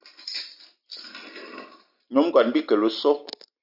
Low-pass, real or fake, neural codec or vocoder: 5.4 kHz; real; none